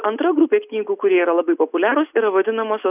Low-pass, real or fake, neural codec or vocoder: 3.6 kHz; real; none